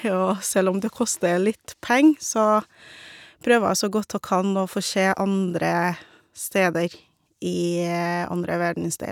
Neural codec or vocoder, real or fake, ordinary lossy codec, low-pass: none; real; none; 19.8 kHz